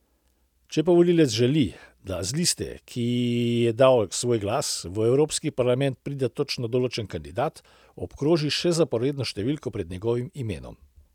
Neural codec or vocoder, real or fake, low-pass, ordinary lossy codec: none; real; 19.8 kHz; none